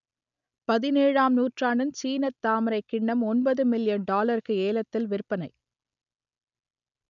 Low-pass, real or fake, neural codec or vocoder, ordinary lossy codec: 7.2 kHz; real; none; none